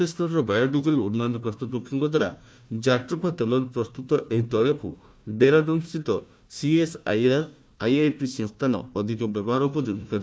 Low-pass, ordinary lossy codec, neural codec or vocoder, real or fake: none; none; codec, 16 kHz, 1 kbps, FunCodec, trained on Chinese and English, 50 frames a second; fake